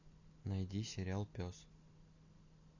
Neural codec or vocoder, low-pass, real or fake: none; 7.2 kHz; real